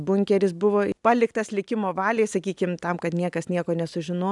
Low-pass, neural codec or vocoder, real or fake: 10.8 kHz; none; real